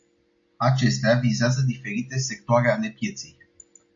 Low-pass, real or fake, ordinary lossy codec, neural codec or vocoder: 7.2 kHz; real; MP3, 64 kbps; none